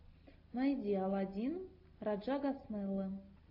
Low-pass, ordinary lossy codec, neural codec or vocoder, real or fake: 5.4 kHz; AAC, 48 kbps; none; real